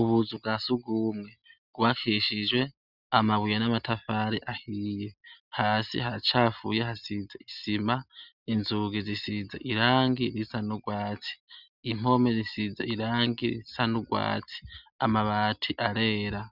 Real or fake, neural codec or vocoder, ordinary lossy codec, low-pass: real; none; Opus, 64 kbps; 5.4 kHz